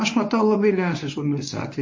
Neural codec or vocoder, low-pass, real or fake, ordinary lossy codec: codec, 24 kHz, 0.9 kbps, WavTokenizer, medium speech release version 1; 7.2 kHz; fake; MP3, 32 kbps